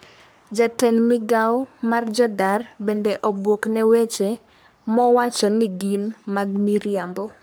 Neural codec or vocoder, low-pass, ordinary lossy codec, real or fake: codec, 44.1 kHz, 3.4 kbps, Pupu-Codec; none; none; fake